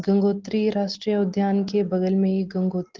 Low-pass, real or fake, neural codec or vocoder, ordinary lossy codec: 7.2 kHz; real; none; Opus, 16 kbps